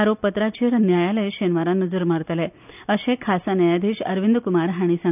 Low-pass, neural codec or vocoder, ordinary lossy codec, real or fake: 3.6 kHz; none; none; real